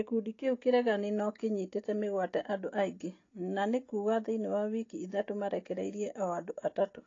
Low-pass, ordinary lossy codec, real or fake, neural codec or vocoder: 7.2 kHz; AAC, 32 kbps; real; none